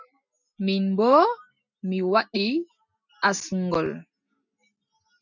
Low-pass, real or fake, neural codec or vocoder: 7.2 kHz; real; none